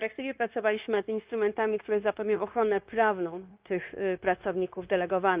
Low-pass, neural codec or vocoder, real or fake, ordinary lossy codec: 3.6 kHz; codec, 16 kHz, 0.9 kbps, LongCat-Audio-Codec; fake; Opus, 24 kbps